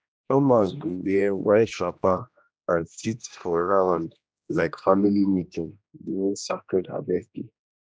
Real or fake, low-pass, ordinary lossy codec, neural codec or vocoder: fake; none; none; codec, 16 kHz, 1 kbps, X-Codec, HuBERT features, trained on general audio